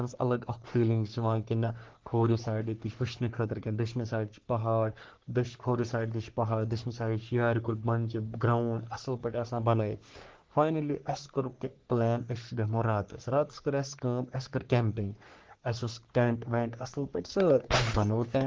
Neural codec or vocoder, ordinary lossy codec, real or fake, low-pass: codec, 44.1 kHz, 3.4 kbps, Pupu-Codec; Opus, 16 kbps; fake; 7.2 kHz